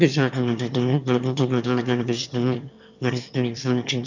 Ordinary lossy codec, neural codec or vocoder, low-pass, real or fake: none; autoencoder, 22.05 kHz, a latent of 192 numbers a frame, VITS, trained on one speaker; 7.2 kHz; fake